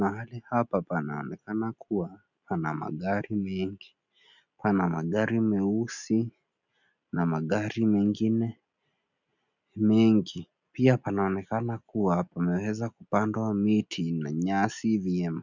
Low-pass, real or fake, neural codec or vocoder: 7.2 kHz; real; none